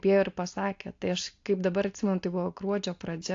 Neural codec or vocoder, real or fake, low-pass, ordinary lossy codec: none; real; 7.2 kHz; AAC, 48 kbps